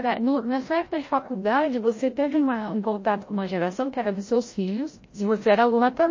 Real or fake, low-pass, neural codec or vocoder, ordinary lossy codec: fake; 7.2 kHz; codec, 16 kHz, 0.5 kbps, FreqCodec, larger model; MP3, 32 kbps